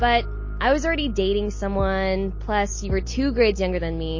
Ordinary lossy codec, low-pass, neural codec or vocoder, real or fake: MP3, 32 kbps; 7.2 kHz; none; real